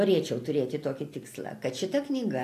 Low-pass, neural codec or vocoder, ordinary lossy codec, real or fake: 14.4 kHz; none; AAC, 64 kbps; real